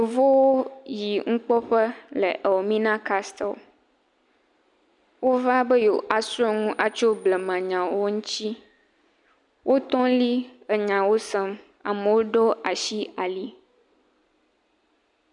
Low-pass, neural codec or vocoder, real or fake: 10.8 kHz; none; real